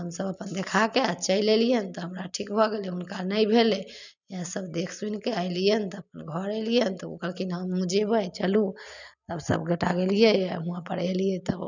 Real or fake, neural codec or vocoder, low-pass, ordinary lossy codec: real; none; 7.2 kHz; none